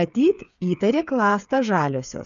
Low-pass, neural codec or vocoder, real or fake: 7.2 kHz; codec, 16 kHz, 8 kbps, FreqCodec, smaller model; fake